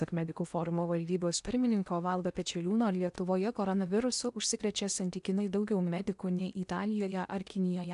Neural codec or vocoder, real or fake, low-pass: codec, 16 kHz in and 24 kHz out, 0.8 kbps, FocalCodec, streaming, 65536 codes; fake; 10.8 kHz